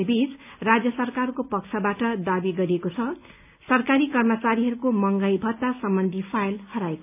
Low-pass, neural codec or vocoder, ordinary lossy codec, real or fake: 3.6 kHz; none; none; real